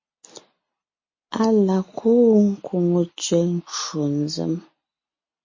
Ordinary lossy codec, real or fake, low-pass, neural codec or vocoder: MP3, 32 kbps; real; 7.2 kHz; none